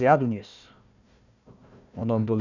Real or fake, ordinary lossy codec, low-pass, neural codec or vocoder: fake; none; 7.2 kHz; codec, 16 kHz, 1 kbps, FunCodec, trained on Chinese and English, 50 frames a second